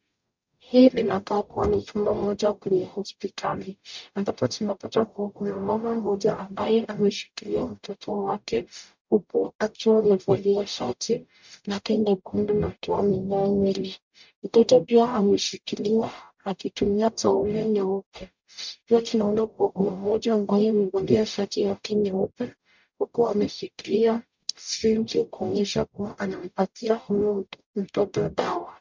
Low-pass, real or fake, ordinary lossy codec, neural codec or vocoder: 7.2 kHz; fake; MP3, 64 kbps; codec, 44.1 kHz, 0.9 kbps, DAC